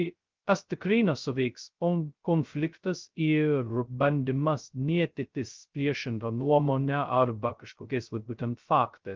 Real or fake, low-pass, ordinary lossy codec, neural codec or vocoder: fake; 7.2 kHz; Opus, 32 kbps; codec, 16 kHz, 0.2 kbps, FocalCodec